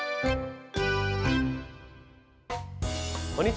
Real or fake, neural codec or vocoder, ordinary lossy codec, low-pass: real; none; none; none